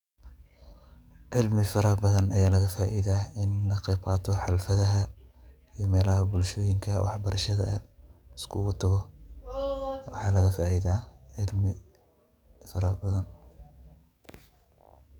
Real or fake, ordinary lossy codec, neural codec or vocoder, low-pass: fake; none; codec, 44.1 kHz, 7.8 kbps, DAC; 19.8 kHz